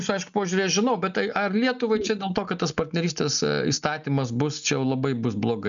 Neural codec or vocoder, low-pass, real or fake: none; 7.2 kHz; real